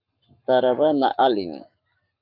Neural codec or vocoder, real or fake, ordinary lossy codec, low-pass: codec, 44.1 kHz, 7.8 kbps, Pupu-Codec; fake; AAC, 48 kbps; 5.4 kHz